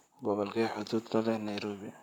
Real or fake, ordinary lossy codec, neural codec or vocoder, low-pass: fake; none; vocoder, 44.1 kHz, 128 mel bands, Pupu-Vocoder; 19.8 kHz